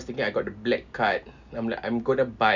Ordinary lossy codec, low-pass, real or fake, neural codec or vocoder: none; 7.2 kHz; real; none